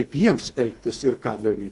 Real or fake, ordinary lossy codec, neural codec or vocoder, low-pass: fake; AAC, 64 kbps; codec, 24 kHz, 3 kbps, HILCodec; 10.8 kHz